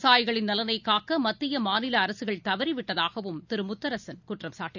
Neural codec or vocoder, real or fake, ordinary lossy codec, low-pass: none; real; none; 7.2 kHz